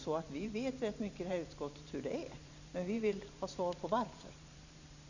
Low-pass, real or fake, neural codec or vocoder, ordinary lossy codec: 7.2 kHz; fake; vocoder, 44.1 kHz, 128 mel bands every 512 samples, BigVGAN v2; none